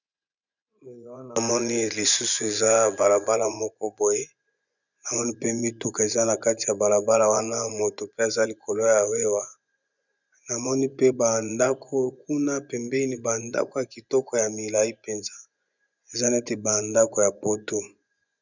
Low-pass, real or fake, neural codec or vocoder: 7.2 kHz; fake; vocoder, 24 kHz, 100 mel bands, Vocos